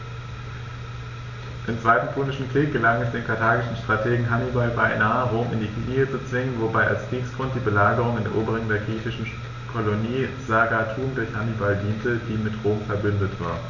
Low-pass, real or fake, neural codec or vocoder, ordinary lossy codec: 7.2 kHz; real; none; none